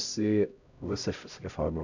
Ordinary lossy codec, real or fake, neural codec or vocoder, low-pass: none; fake; codec, 16 kHz, 0.5 kbps, X-Codec, HuBERT features, trained on LibriSpeech; 7.2 kHz